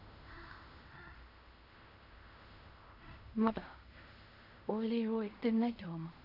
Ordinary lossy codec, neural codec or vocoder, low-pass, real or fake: none; codec, 16 kHz in and 24 kHz out, 0.4 kbps, LongCat-Audio-Codec, fine tuned four codebook decoder; 5.4 kHz; fake